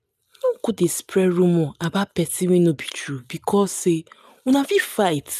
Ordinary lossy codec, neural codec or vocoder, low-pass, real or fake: none; none; 14.4 kHz; real